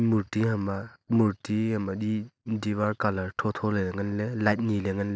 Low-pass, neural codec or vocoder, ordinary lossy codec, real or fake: none; none; none; real